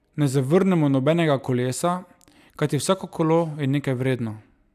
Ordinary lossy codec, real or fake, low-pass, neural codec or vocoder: none; real; 14.4 kHz; none